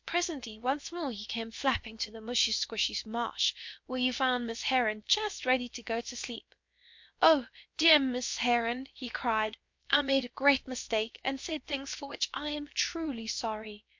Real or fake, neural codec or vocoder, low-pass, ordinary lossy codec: fake; codec, 16 kHz, about 1 kbps, DyCAST, with the encoder's durations; 7.2 kHz; MP3, 48 kbps